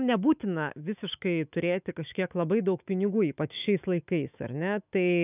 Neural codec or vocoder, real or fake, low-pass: autoencoder, 48 kHz, 128 numbers a frame, DAC-VAE, trained on Japanese speech; fake; 3.6 kHz